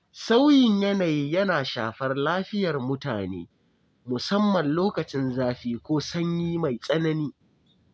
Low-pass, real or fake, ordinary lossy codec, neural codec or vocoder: none; real; none; none